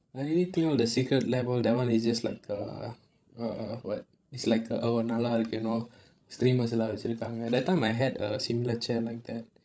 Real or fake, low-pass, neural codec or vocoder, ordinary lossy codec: fake; none; codec, 16 kHz, 16 kbps, FreqCodec, larger model; none